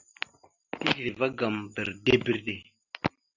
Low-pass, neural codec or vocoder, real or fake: 7.2 kHz; none; real